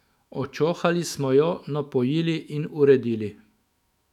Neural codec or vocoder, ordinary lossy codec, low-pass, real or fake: autoencoder, 48 kHz, 128 numbers a frame, DAC-VAE, trained on Japanese speech; none; 19.8 kHz; fake